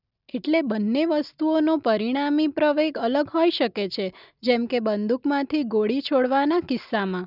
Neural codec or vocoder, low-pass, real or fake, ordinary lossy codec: none; 5.4 kHz; real; none